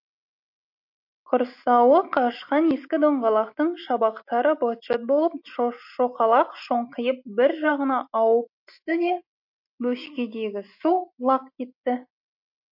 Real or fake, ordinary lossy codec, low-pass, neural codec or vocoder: real; MP3, 32 kbps; 5.4 kHz; none